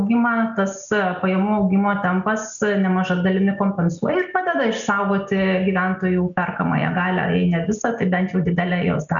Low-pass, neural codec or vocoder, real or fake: 7.2 kHz; none; real